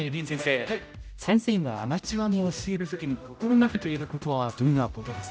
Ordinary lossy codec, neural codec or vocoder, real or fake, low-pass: none; codec, 16 kHz, 0.5 kbps, X-Codec, HuBERT features, trained on general audio; fake; none